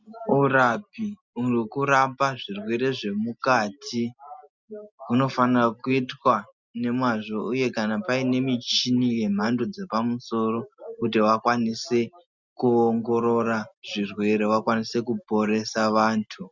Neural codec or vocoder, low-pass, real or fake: none; 7.2 kHz; real